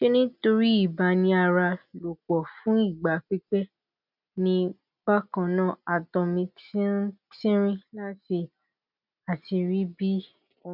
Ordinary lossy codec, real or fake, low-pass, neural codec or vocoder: none; real; 5.4 kHz; none